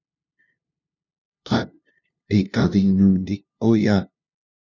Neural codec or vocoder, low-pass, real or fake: codec, 16 kHz, 0.5 kbps, FunCodec, trained on LibriTTS, 25 frames a second; 7.2 kHz; fake